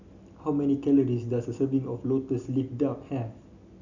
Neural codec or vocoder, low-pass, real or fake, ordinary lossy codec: none; 7.2 kHz; real; none